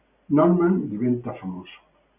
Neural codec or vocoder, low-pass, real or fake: none; 3.6 kHz; real